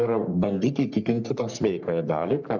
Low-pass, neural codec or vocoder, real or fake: 7.2 kHz; codec, 44.1 kHz, 3.4 kbps, Pupu-Codec; fake